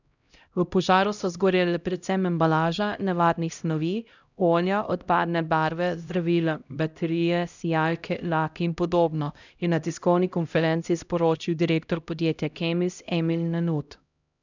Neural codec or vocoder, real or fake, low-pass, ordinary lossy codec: codec, 16 kHz, 0.5 kbps, X-Codec, HuBERT features, trained on LibriSpeech; fake; 7.2 kHz; none